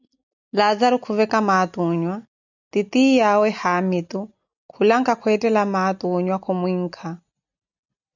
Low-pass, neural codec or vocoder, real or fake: 7.2 kHz; none; real